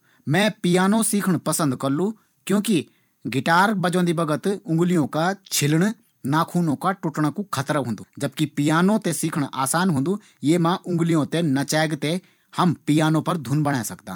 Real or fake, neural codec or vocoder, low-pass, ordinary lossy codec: fake; vocoder, 44.1 kHz, 128 mel bands every 256 samples, BigVGAN v2; 19.8 kHz; none